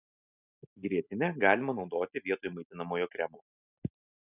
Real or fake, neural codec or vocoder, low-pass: real; none; 3.6 kHz